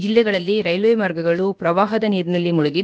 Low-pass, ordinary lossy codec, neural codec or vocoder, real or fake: none; none; codec, 16 kHz, about 1 kbps, DyCAST, with the encoder's durations; fake